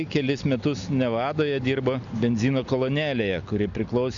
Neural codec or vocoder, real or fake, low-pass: none; real; 7.2 kHz